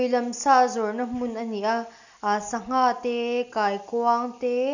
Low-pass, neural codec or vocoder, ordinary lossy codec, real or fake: 7.2 kHz; none; none; real